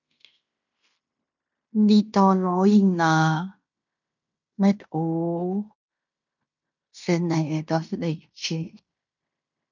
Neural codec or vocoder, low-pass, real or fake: codec, 16 kHz in and 24 kHz out, 0.9 kbps, LongCat-Audio-Codec, fine tuned four codebook decoder; 7.2 kHz; fake